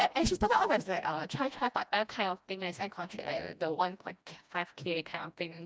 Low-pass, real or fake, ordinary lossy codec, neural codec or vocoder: none; fake; none; codec, 16 kHz, 1 kbps, FreqCodec, smaller model